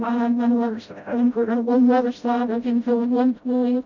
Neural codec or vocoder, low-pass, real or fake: codec, 16 kHz, 0.5 kbps, FreqCodec, smaller model; 7.2 kHz; fake